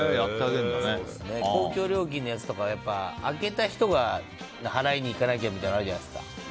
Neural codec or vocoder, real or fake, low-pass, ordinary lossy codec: none; real; none; none